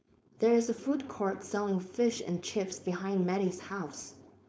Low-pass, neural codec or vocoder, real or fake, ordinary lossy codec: none; codec, 16 kHz, 4.8 kbps, FACodec; fake; none